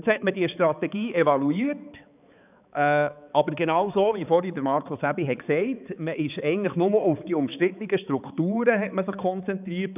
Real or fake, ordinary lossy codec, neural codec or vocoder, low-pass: fake; none; codec, 16 kHz, 4 kbps, X-Codec, HuBERT features, trained on balanced general audio; 3.6 kHz